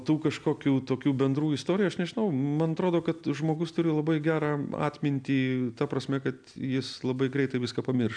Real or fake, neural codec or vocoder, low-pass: real; none; 9.9 kHz